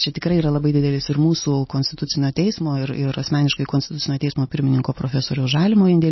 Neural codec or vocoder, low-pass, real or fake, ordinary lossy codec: none; 7.2 kHz; real; MP3, 24 kbps